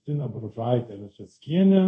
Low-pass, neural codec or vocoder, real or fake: 10.8 kHz; codec, 24 kHz, 0.5 kbps, DualCodec; fake